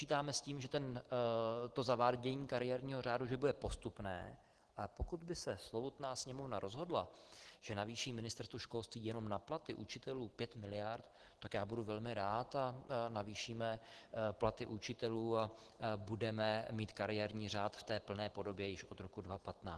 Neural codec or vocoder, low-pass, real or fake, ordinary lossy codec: none; 10.8 kHz; real; Opus, 16 kbps